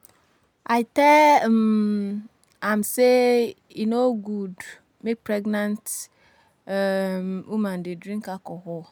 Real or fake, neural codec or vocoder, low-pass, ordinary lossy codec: real; none; none; none